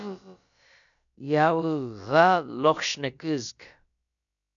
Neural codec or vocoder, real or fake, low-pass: codec, 16 kHz, about 1 kbps, DyCAST, with the encoder's durations; fake; 7.2 kHz